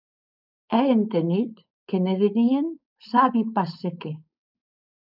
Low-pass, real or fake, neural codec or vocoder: 5.4 kHz; fake; codec, 16 kHz, 4.8 kbps, FACodec